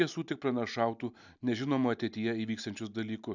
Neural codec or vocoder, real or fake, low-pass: none; real; 7.2 kHz